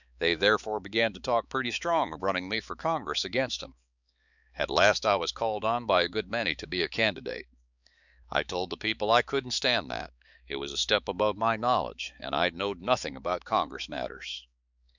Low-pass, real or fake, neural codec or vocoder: 7.2 kHz; fake; codec, 16 kHz, 4 kbps, X-Codec, HuBERT features, trained on balanced general audio